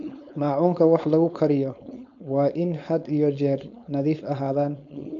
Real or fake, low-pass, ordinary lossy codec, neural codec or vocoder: fake; 7.2 kHz; AAC, 64 kbps; codec, 16 kHz, 4.8 kbps, FACodec